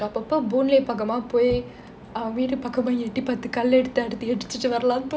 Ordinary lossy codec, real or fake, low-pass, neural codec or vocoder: none; real; none; none